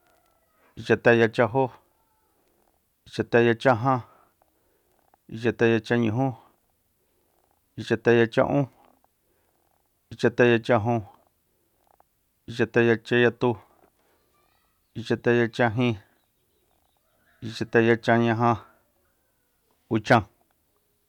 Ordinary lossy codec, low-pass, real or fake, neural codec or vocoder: none; 19.8 kHz; real; none